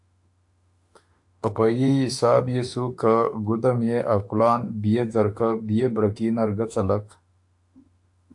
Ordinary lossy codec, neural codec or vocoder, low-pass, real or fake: AAC, 64 kbps; autoencoder, 48 kHz, 32 numbers a frame, DAC-VAE, trained on Japanese speech; 10.8 kHz; fake